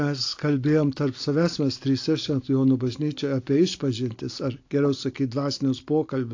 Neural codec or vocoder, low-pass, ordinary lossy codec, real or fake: none; 7.2 kHz; AAC, 48 kbps; real